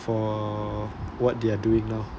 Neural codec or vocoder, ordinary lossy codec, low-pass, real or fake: none; none; none; real